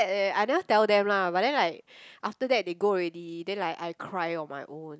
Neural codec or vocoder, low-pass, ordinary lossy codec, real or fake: none; none; none; real